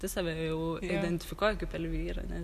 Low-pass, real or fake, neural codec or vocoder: 14.4 kHz; real; none